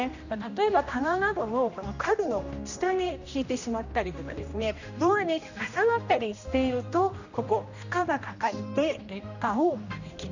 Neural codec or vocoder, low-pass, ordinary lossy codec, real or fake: codec, 16 kHz, 1 kbps, X-Codec, HuBERT features, trained on general audio; 7.2 kHz; none; fake